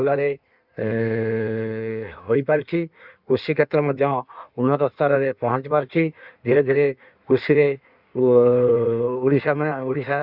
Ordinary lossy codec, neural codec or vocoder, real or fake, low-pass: Opus, 64 kbps; codec, 16 kHz in and 24 kHz out, 1.1 kbps, FireRedTTS-2 codec; fake; 5.4 kHz